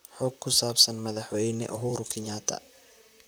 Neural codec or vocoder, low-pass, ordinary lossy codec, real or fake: vocoder, 44.1 kHz, 128 mel bands, Pupu-Vocoder; none; none; fake